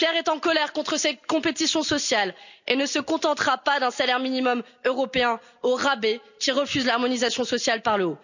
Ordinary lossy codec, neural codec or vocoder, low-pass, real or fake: none; none; 7.2 kHz; real